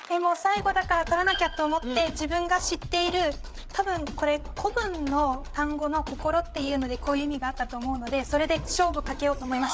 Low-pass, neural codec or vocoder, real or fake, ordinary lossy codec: none; codec, 16 kHz, 8 kbps, FreqCodec, larger model; fake; none